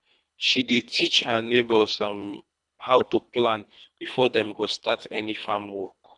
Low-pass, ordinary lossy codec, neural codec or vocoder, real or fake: 10.8 kHz; none; codec, 24 kHz, 1.5 kbps, HILCodec; fake